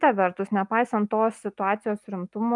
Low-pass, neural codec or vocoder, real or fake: 10.8 kHz; none; real